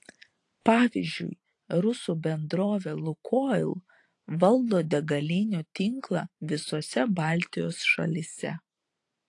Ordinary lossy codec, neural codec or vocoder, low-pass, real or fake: AAC, 48 kbps; vocoder, 44.1 kHz, 128 mel bands every 512 samples, BigVGAN v2; 10.8 kHz; fake